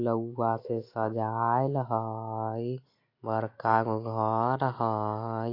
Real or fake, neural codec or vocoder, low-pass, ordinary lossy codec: real; none; 5.4 kHz; AAC, 32 kbps